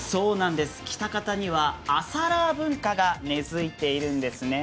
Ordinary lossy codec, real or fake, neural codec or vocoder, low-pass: none; real; none; none